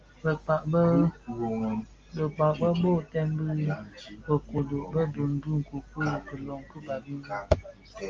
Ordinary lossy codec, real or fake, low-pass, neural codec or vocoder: Opus, 32 kbps; real; 7.2 kHz; none